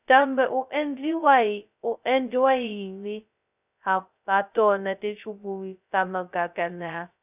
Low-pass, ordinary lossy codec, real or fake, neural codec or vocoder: 3.6 kHz; none; fake; codec, 16 kHz, 0.2 kbps, FocalCodec